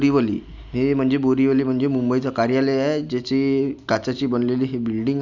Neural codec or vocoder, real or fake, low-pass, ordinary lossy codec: none; real; 7.2 kHz; none